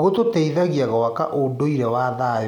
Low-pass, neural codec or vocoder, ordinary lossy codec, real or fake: 19.8 kHz; none; none; real